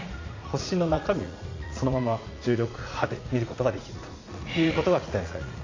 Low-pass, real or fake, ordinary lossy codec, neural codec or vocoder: 7.2 kHz; fake; AAC, 32 kbps; vocoder, 44.1 kHz, 80 mel bands, Vocos